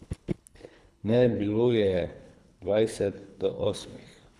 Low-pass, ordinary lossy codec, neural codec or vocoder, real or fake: none; none; codec, 24 kHz, 3 kbps, HILCodec; fake